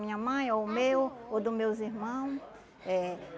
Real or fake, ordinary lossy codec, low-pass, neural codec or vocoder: real; none; none; none